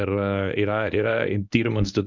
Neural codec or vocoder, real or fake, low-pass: codec, 24 kHz, 0.9 kbps, WavTokenizer, medium speech release version 1; fake; 7.2 kHz